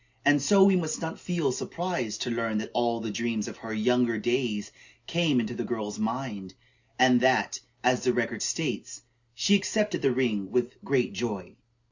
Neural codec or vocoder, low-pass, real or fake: none; 7.2 kHz; real